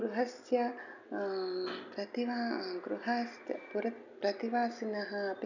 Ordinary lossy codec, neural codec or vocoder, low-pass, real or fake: none; none; 7.2 kHz; real